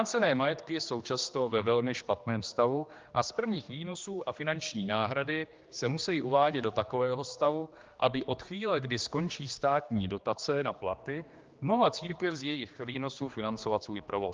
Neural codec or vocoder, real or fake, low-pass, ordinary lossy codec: codec, 16 kHz, 2 kbps, X-Codec, HuBERT features, trained on general audio; fake; 7.2 kHz; Opus, 24 kbps